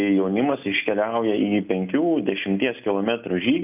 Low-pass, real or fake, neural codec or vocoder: 3.6 kHz; real; none